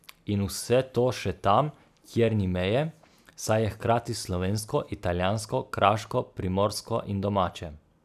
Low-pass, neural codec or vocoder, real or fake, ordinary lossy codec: 14.4 kHz; none; real; none